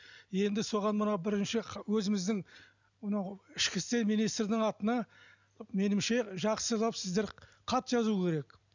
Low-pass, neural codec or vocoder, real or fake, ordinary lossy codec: 7.2 kHz; none; real; none